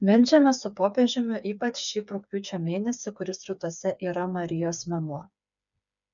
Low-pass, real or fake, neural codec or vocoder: 7.2 kHz; fake; codec, 16 kHz, 4 kbps, FreqCodec, smaller model